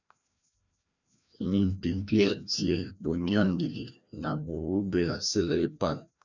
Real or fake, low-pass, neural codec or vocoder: fake; 7.2 kHz; codec, 16 kHz, 1 kbps, FreqCodec, larger model